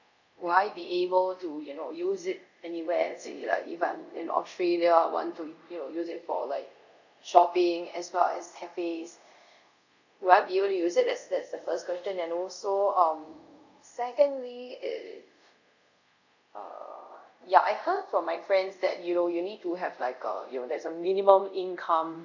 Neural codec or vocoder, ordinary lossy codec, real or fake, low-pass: codec, 24 kHz, 0.5 kbps, DualCodec; none; fake; 7.2 kHz